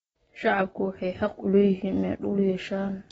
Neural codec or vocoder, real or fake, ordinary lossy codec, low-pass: none; real; AAC, 24 kbps; 10.8 kHz